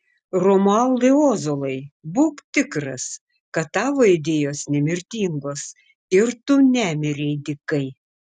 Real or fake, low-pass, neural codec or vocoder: real; 10.8 kHz; none